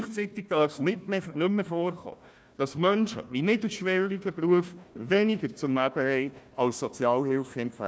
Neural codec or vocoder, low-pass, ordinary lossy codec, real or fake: codec, 16 kHz, 1 kbps, FunCodec, trained on Chinese and English, 50 frames a second; none; none; fake